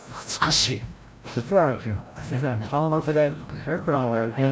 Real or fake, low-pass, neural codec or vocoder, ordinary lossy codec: fake; none; codec, 16 kHz, 0.5 kbps, FreqCodec, larger model; none